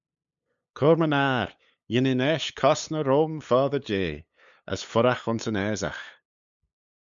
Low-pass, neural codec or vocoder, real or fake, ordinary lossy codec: 7.2 kHz; codec, 16 kHz, 8 kbps, FunCodec, trained on LibriTTS, 25 frames a second; fake; MP3, 64 kbps